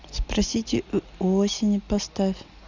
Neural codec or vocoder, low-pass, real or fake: none; 7.2 kHz; real